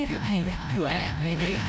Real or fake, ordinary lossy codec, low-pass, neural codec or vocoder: fake; none; none; codec, 16 kHz, 0.5 kbps, FreqCodec, larger model